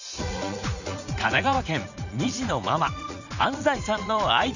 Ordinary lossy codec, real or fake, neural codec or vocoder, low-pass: none; fake; vocoder, 44.1 kHz, 80 mel bands, Vocos; 7.2 kHz